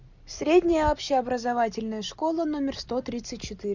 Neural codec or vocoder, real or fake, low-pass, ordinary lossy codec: none; real; 7.2 kHz; Opus, 64 kbps